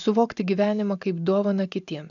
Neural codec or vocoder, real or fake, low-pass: none; real; 7.2 kHz